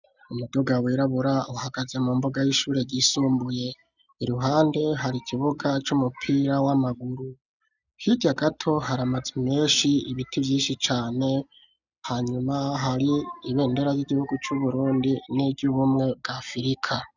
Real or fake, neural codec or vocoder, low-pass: real; none; 7.2 kHz